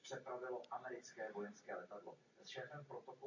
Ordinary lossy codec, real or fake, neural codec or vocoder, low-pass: AAC, 48 kbps; real; none; 7.2 kHz